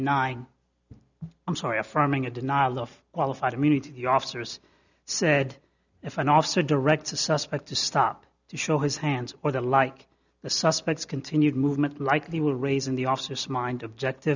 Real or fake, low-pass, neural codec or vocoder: real; 7.2 kHz; none